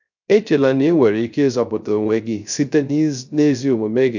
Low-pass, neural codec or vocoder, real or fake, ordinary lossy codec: 7.2 kHz; codec, 16 kHz, 0.3 kbps, FocalCodec; fake; none